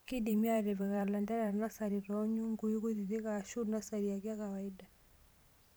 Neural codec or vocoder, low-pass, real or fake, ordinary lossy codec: vocoder, 44.1 kHz, 128 mel bands every 512 samples, BigVGAN v2; none; fake; none